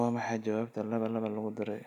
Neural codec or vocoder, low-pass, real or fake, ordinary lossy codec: none; 19.8 kHz; real; none